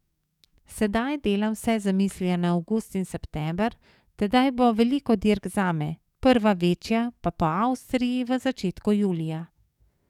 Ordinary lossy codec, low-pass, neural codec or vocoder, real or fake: none; 19.8 kHz; codec, 44.1 kHz, 7.8 kbps, DAC; fake